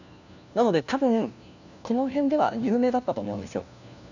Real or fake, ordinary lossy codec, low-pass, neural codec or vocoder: fake; none; 7.2 kHz; codec, 16 kHz, 1 kbps, FunCodec, trained on LibriTTS, 50 frames a second